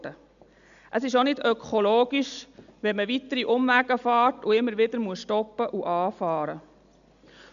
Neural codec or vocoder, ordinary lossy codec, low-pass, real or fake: none; none; 7.2 kHz; real